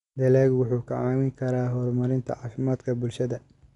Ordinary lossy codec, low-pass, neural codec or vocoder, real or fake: none; 10.8 kHz; none; real